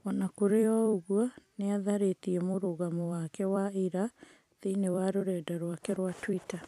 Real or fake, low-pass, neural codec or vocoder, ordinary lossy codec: fake; 14.4 kHz; vocoder, 44.1 kHz, 128 mel bands every 256 samples, BigVGAN v2; none